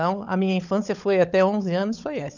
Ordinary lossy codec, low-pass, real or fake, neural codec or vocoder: none; 7.2 kHz; fake; codec, 16 kHz, 16 kbps, FunCodec, trained on LibriTTS, 50 frames a second